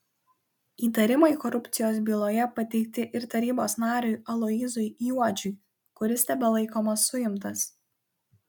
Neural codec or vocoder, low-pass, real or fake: none; 19.8 kHz; real